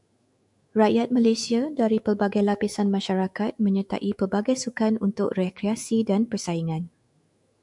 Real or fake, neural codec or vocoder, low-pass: fake; autoencoder, 48 kHz, 128 numbers a frame, DAC-VAE, trained on Japanese speech; 10.8 kHz